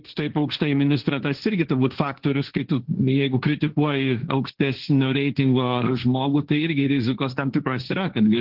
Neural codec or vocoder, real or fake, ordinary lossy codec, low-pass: codec, 16 kHz, 1.1 kbps, Voila-Tokenizer; fake; Opus, 16 kbps; 5.4 kHz